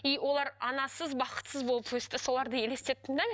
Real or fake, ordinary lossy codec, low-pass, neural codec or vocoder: real; none; none; none